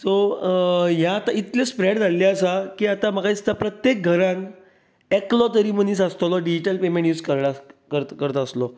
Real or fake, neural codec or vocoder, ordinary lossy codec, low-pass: real; none; none; none